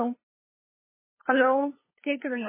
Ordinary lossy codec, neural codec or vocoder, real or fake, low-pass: MP3, 16 kbps; codec, 16 kHz, 2 kbps, FunCodec, trained on LibriTTS, 25 frames a second; fake; 3.6 kHz